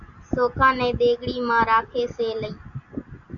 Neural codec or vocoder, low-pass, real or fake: none; 7.2 kHz; real